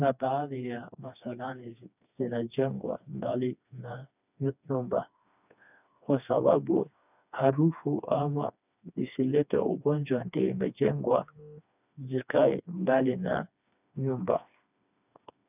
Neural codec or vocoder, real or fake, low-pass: codec, 16 kHz, 2 kbps, FreqCodec, smaller model; fake; 3.6 kHz